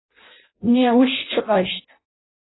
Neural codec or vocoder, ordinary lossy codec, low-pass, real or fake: codec, 16 kHz in and 24 kHz out, 0.6 kbps, FireRedTTS-2 codec; AAC, 16 kbps; 7.2 kHz; fake